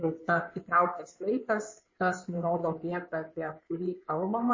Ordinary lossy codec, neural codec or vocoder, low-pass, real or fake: MP3, 32 kbps; codec, 16 kHz in and 24 kHz out, 2.2 kbps, FireRedTTS-2 codec; 7.2 kHz; fake